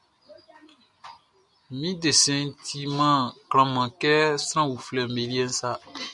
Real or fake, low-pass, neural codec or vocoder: real; 10.8 kHz; none